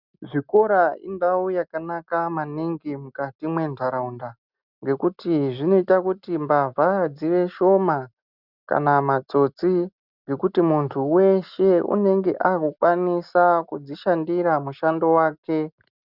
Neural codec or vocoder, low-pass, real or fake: none; 5.4 kHz; real